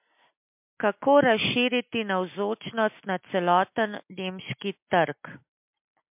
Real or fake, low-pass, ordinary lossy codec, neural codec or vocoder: real; 3.6 kHz; MP3, 32 kbps; none